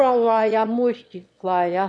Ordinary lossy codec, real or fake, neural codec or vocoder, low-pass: none; fake; autoencoder, 22.05 kHz, a latent of 192 numbers a frame, VITS, trained on one speaker; none